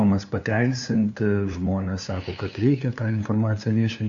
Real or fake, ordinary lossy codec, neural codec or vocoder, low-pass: fake; AAC, 64 kbps; codec, 16 kHz, 2 kbps, FunCodec, trained on LibriTTS, 25 frames a second; 7.2 kHz